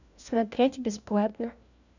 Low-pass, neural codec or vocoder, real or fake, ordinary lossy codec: 7.2 kHz; codec, 16 kHz, 1 kbps, FunCodec, trained on LibriTTS, 50 frames a second; fake; none